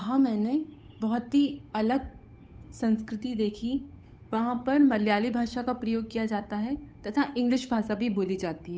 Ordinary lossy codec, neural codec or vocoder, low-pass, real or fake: none; codec, 16 kHz, 8 kbps, FunCodec, trained on Chinese and English, 25 frames a second; none; fake